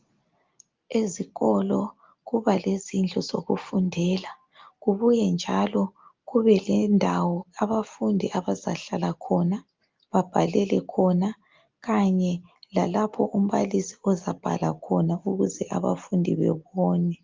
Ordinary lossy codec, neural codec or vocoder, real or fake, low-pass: Opus, 32 kbps; none; real; 7.2 kHz